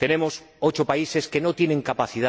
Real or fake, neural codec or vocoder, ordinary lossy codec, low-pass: real; none; none; none